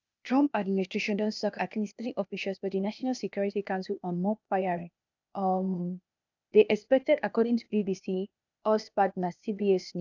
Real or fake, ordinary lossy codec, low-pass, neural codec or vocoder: fake; none; 7.2 kHz; codec, 16 kHz, 0.8 kbps, ZipCodec